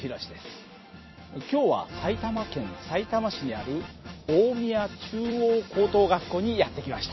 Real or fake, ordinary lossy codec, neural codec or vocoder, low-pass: fake; MP3, 24 kbps; vocoder, 44.1 kHz, 128 mel bands every 256 samples, BigVGAN v2; 7.2 kHz